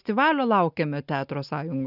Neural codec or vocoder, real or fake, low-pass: none; real; 5.4 kHz